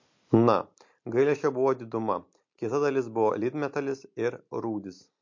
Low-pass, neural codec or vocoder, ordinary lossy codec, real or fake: 7.2 kHz; none; MP3, 48 kbps; real